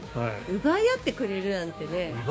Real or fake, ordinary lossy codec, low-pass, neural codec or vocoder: fake; none; none; codec, 16 kHz, 6 kbps, DAC